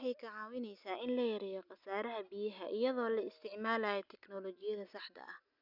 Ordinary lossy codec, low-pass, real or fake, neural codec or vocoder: none; 5.4 kHz; real; none